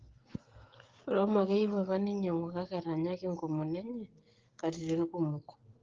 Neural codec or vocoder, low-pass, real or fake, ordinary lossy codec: codec, 16 kHz, 16 kbps, FreqCodec, smaller model; 7.2 kHz; fake; Opus, 16 kbps